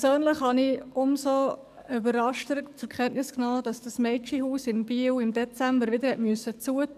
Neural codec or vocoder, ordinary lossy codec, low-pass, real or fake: codec, 44.1 kHz, 7.8 kbps, DAC; none; 14.4 kHz; fake